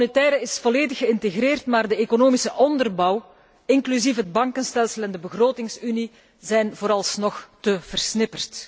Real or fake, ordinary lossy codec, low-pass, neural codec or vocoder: real; none; none; none